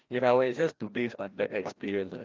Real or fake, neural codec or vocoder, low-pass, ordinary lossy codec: fake; codec, 16 kHz, 1 kbps, FreqCodec, larger model; 7.2 kHz; Opus, 32 kbps